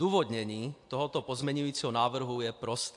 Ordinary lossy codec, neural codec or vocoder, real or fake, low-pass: AAC, 64 kbps; none; real; 10.8 kHz